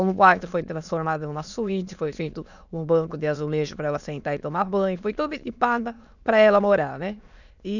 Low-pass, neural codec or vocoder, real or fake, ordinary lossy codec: 7.2 kHz; autoencoder, 22.05 kHz, a latent of 192 numbers a frame, VITS, trained on many speakers; fake; none